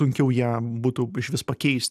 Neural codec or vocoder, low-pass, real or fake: none; 14.4 kHz; real